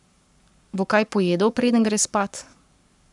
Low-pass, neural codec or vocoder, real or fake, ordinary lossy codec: 10.8 kHz; codec, 44.1 kHz, 7.8 kbps, Pupu-Codec; fake; none